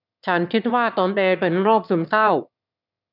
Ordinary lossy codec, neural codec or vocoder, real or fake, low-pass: none; autoencoder, 22.05 kHz, a latent of 192 numbers a frame, VITS, trained on one speaker; fake; 5.4 kHz